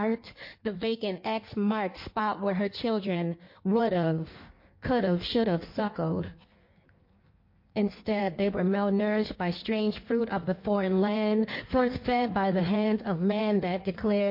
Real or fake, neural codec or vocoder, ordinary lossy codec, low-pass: fake; codec, 16 kHz in and 24 kHz out, 1.1 kbps, FireRedTTS-2 codec; MP3, 32 kbps; 5.4 kHz